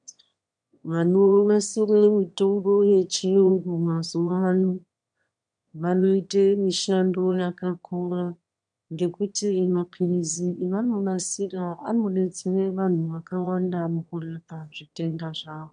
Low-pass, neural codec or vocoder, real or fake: 9.9 kHz; autoencoder, 22.05 kHz, a latent of 192 numbers a frame, VITS, trained on one speaker; fake